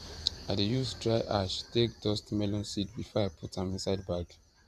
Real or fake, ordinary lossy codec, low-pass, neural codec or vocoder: fake; none; 14.4 kHz; vocoder, 44.1 kHz, 128 mel bands every 512 samples, BigVGAN v2